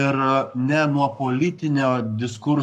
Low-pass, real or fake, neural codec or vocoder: 14.4 kHz; fake; codec, 44.1 kHz, 7.8 kbps, Pupu-Codec